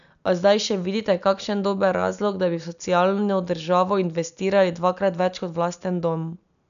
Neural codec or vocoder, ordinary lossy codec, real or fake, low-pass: none; none; real; 7.2 kHz